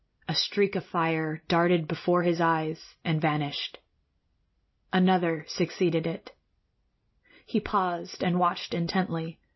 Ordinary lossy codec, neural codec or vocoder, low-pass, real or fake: MP3, 24 kbps; none; 7.2 kHz; real